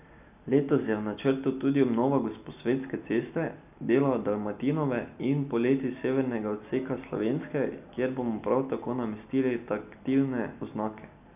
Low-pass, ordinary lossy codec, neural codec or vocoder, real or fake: 3.6 kHz; none; none; real